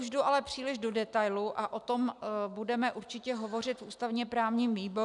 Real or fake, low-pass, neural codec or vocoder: real; 10.8 kHz; none